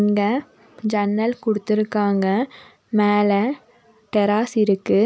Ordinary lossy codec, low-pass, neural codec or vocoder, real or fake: none; none; none; real